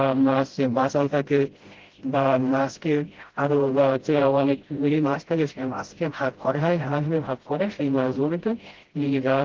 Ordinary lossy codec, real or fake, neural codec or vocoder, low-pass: Opus, 16 kbps; fake; codec, 16 kHz, 0.5 kbps, FreqCodec, smaller model; 7.2 kHz